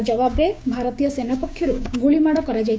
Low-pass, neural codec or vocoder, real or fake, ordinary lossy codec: none; codec, 16 kHz, 6 kbps, DAC; fake; none